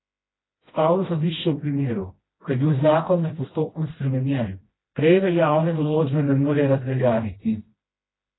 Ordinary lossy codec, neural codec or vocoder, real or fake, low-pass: AAC, 16 kbps; codec, 16 kHz, 1 kbps, FreqCodec, smaller model; fake; 7.2 kHz